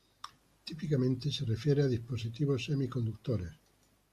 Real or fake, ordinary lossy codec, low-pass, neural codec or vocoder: real; Opus, 64 kbps; 14.4 kHz; none